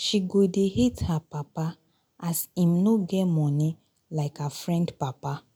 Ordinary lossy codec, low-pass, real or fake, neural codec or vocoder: none; 19.8 kHz; real; none